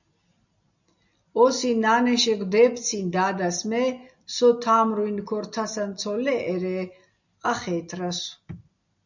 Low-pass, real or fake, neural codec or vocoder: 7.2 kHz; real; none